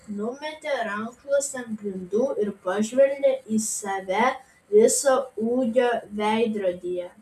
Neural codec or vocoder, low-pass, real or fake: none; 14.4 kHz; real